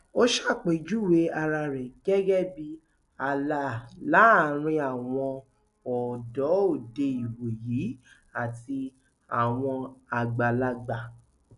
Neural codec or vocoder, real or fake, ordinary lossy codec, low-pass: none; real; none; 10.8 kHz